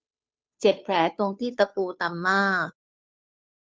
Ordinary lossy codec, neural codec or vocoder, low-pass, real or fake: none; codec, 16 kHz, 2 kbps, FunCodec, trained on Chinese and English, 25 frames a second; none; fake